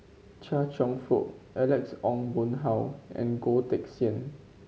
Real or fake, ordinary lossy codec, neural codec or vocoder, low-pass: real; none; none; none